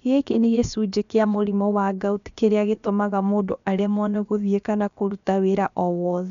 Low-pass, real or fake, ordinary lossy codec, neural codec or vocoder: 7.2 kHz; fake; none; codec, 16 kHz, about 1 kbps, DyCAST, with the encoder's durations